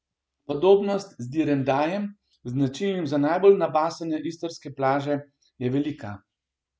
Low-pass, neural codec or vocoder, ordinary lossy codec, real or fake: none; none; none; real